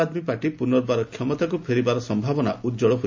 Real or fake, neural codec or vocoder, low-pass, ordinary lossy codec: real; none; 7.2 kHz; MP3, 48 kbps